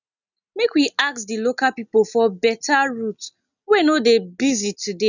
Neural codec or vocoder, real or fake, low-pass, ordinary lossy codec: none; real; 7.2 kHz; none